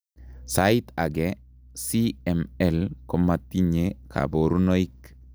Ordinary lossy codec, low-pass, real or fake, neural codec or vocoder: none; none; real; none